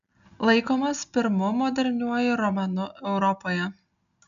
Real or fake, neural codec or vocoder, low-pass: real; none; 7.2 kHz